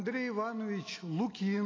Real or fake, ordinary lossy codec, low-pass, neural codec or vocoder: real; AAC, 32 kbps; 7.2 kHz; none